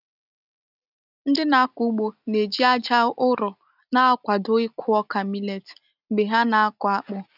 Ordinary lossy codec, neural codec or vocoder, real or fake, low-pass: none; none; real; 5.4 kHz